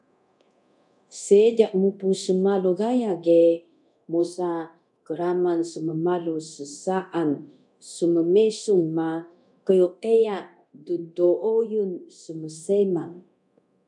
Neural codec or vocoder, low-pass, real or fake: codec, 24 kHz, 0.9 kbps, DualCodec; 10.8 kHz; fake